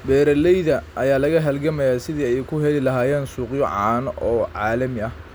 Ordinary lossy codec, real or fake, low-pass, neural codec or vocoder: none; real; none; none